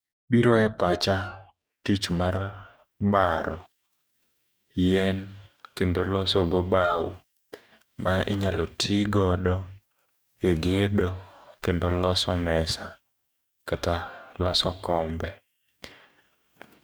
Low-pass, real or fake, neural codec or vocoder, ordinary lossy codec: none; fake; codec, 44.1 kHz, 2.6 kbps, DAC; none